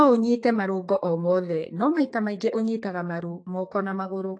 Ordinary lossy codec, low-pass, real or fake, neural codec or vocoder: AAC, 48 kbps; 9.9 kHz; fake; codec, 32 kHz, 1.9 kbps, SNAC